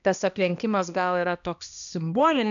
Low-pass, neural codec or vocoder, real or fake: 7.2 kHz; codec, 16 kHz, 1 kbps, X-Codec, HuBERT features, trained on balanced general audio; fake